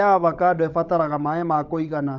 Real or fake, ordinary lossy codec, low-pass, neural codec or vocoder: fake; none; 7.2 kHz; codec, 16 kHz, 16 kbps, FunCodec, trained on LibriTTS, 50 frames a second